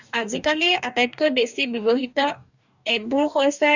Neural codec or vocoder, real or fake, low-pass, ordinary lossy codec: codec, 44.1 kHz, 2.6 kbps, DAC; fake; 7.2 kHz; none